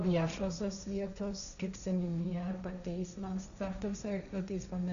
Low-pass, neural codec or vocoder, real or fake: 7.2 kHz; codec, 16 kHz, 1.1 kbps, Voila-Tokenizer; fake